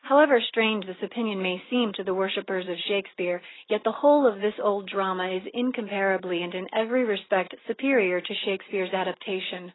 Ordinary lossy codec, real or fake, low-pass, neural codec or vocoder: AAC, 16 kbps; real; 7.2 kHz; none